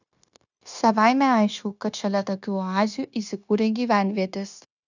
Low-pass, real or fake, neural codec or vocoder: 7.2 kHz; fake; codec, 16 kHz, 0.9 kbps, LongCat-Audio-Codec